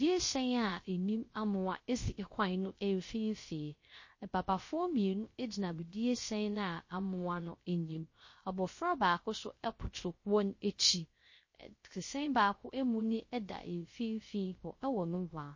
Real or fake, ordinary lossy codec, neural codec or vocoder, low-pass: fake; MP3, 32 kbps; codec, 16 kHz, 0.3 kbps, FocalCodec; 7.2 kHz